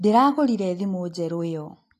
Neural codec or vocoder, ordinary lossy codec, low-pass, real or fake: none; AAC, 48 kbps; 14.4 kHz; real